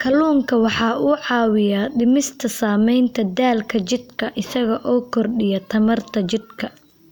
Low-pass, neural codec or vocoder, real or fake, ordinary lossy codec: none; none; real; none